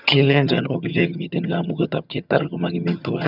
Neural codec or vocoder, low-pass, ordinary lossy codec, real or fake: vocoder, 22.05 kHz, 80 mel bands, HiFi-GAN; 5.4 kHz; none; fake